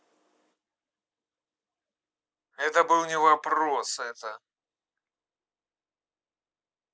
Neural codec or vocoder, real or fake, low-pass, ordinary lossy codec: none; real; none; none